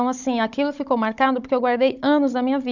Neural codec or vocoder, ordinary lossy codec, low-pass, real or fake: codec, 16 kHz, 16 kbps, FunCodec, trained on Chinese and English, 50 frames a second; none; 7.2 kHz; fake